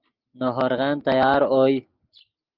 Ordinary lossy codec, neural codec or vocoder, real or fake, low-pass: Opus, 32 kbps; none; real; 5.4 kHz